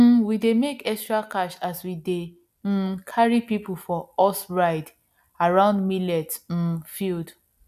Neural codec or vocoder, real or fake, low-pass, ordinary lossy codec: none; real; 14.4 kHz; none